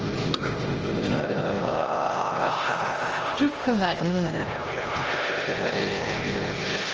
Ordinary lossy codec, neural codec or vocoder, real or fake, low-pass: Opus, 24 kbps; codec, 16 kHz, 1 kbps, X-Codec, HuBERT features, trained on LibriSpeech; fake; 7.2 kHz